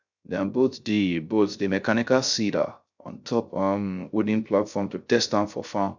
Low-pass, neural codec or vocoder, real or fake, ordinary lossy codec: 7.2 kHz; codec, 16 kHz, 0.3 kbps, FocalCodec; fake; none